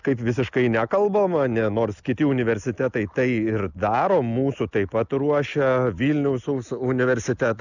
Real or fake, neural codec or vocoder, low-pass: real; none; 7.2 kHz